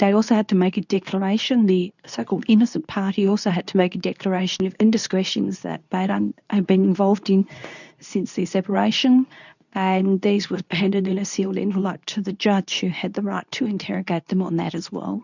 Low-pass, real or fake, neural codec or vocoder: 7.2 kHz; fake; codec, 24 kHz, 0.9 kbps, WavTokenizer, medium speech release version 2